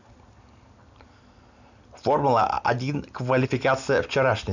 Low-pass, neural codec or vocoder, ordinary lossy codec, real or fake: 7.2 kHz; none; none; real